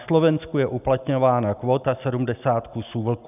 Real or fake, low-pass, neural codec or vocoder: real; 3.6 kHz; none